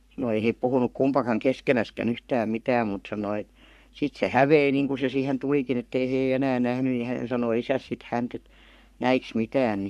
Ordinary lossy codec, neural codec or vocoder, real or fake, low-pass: none; codec, 44.1 kHz, 3.4 kbps, Pupu-Codec; fake; 14.4 kHz